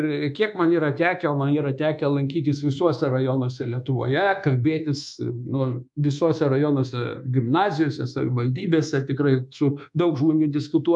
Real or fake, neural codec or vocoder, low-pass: fake; codec, 24 kHz, 1.2 kbps, DualCodec; 10.8 kHz